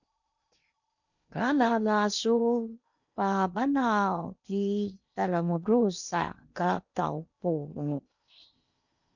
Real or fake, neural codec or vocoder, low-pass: fake; codec, 16 kHz in and 24 kHz out, 0.8 kbps, FocalCodec, streaming, 65536 codes; 7.2 kHz